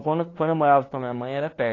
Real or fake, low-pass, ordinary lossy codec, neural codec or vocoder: fake; 7.2 kHz; AAC, 32 kbps; codec, 16 kHz, 2 kbps, FunCodec, trained on LibriTTS, 25 frames a second